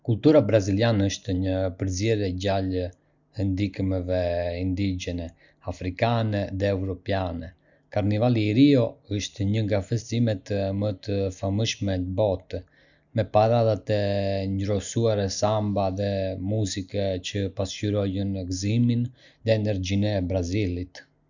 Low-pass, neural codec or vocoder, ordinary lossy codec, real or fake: 7.2 kHz; none; none; real